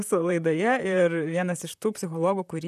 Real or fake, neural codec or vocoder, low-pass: fake; vocoder, 44.1 kHz, 128 mel bands, Pupu-Vocoder; 14.4 kHz